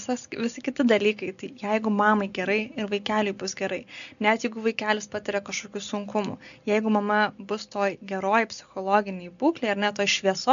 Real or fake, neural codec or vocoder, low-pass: real; none; 7.2 kHz